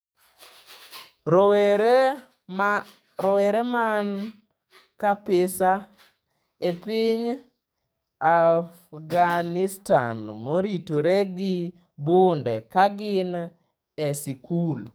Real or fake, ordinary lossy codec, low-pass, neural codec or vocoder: fake; none; none; codec, 44.1 kHz, 2.6 kbps, SNAC